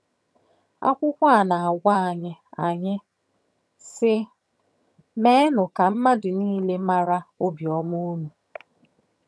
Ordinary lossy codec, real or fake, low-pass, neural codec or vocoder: none; fake; none; vocoder, 22.05 kHz, 80 mel bands, HiFi-GAN